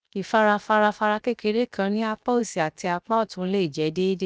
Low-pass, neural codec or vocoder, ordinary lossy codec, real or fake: none; codec, 16 kHz, 0.7 kbps, FocalCodec; none; fake